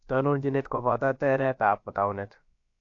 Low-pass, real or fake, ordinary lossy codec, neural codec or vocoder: 7.2 kHz; fake; AAC, 48 kbps; codec, 16 kHz, about 1 kbps, DyCAST, with the encoder's durations